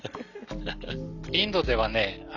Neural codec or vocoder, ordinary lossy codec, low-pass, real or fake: none; none; 7.2 kHz; real